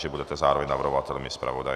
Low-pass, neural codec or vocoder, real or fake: 14.4 kHz; none; real